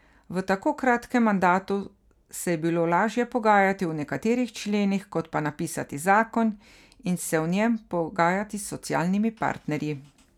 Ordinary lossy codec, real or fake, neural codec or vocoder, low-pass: none; real; none; 19.8 kHz